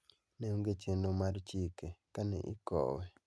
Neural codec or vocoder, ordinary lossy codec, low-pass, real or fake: none; none; none; real